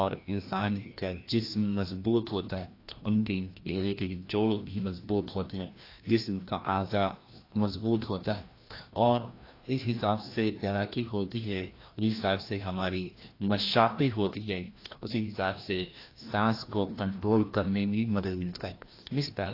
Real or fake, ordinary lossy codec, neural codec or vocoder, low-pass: fake; AAC, 32 kbps; codec, 16 kHz, 1 kbps, FreqCodec, larger model; 5.4 kHz